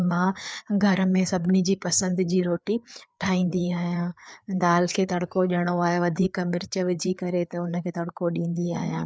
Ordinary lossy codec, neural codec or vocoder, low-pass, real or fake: none; codec, 16 kHz, 4 kbps, FreqCodec, larger model; none; fake